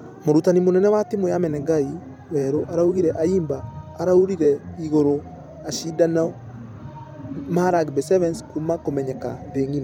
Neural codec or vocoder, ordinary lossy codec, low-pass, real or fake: vocoder, 44.1 kHz, 128 mel bands every 512 samples, BigVGAN v2; none; 19.8 kHz; fake